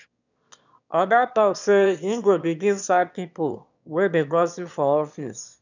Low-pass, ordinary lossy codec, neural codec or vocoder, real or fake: 7.2 kHz; none; autoencoder, 22.05 kHz, a latent of 192 numbers a frame, VITS, trained on one speaker; fake